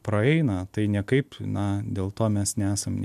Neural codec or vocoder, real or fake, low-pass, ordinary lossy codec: none; real; 14.4 kHz; MP3, 96 kbps